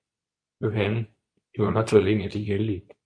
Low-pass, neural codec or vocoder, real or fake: 9.9 kHz; codec, 24 kHz, 0.9 kbps, WavTokenizer, medium speech release version 2; fake